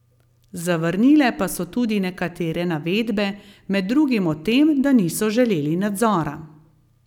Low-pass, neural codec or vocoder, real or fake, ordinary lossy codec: 19.8 kHz; none; real; none